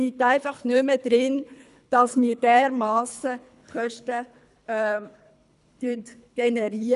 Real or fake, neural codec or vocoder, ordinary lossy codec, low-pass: fake; codec, 24 kHz, 3 kbps, HILCodec; none; 10.8 kHz